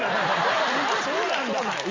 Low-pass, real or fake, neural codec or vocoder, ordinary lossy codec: 7.2 kHz; real; none; Opus, 32 kbps